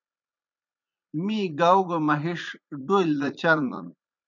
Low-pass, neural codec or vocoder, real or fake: 7.2 kHz; vocoder, 44.1 kHz, 80 mel bands, Vocos; fake